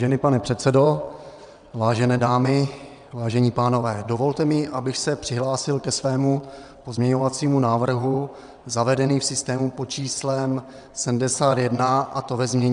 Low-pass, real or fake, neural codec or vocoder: 9.9 kHz; fake; vocoder, 22.05 kHz, 80 mel bands, WaveNeXt